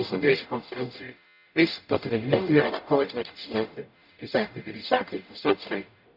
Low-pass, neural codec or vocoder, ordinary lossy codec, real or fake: 5.4 kHz; codec, 44.1 kHz, 0.9 kbps, DAC; none; fake